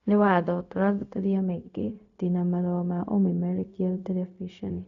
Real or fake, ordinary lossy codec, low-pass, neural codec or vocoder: fake; none; 7.2 kHz; codec, 16 kHz, 0.4 kbps, LongCat-Audio-Codec